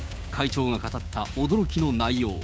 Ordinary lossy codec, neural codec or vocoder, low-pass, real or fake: none; codec, 16 kHz, 6 kbps, DAC; none; fake